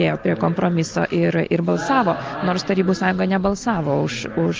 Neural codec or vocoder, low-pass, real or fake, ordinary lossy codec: none; 7.2 kHz; real; Opus, 32 kbps